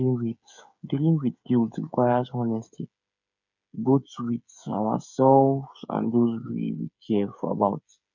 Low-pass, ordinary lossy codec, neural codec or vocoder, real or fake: 7.2 kHz; none; codec, 16 kHz, 8 kbps, FreqCodec, smaller model; fake